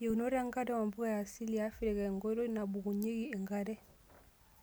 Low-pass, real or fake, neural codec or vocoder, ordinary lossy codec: none; real; none; none